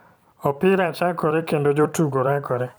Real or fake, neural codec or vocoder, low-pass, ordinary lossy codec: fake; vocoder, 44.1 kHz, 128 mel bands every 256 samples, BigVGAN v2; none; none